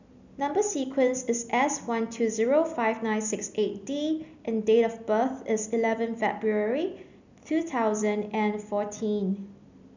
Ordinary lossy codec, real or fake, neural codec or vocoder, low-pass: none; real; none; 7.2 kHz